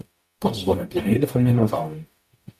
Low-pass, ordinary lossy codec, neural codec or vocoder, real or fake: 14.4 kHz; MP3, 96 kbps; codec, 44.1 kHz, 0.9 kbps, DAC; fake